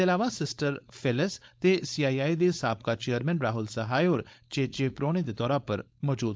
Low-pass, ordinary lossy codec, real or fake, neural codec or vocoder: none; none; fake; codec, 16 kHz, 4.8 kbps, FACodec